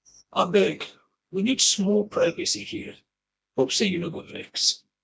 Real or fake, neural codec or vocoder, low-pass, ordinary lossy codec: fake; codec, 16 kHz, 1 kbps, FreqCodec, smaller model; none; none